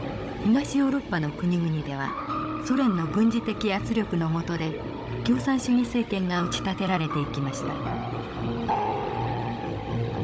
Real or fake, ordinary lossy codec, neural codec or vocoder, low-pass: fake; none; codec, 16 kHz, 16 kbps, FunCodec, trained on Chinese and English, 50 frames a second; none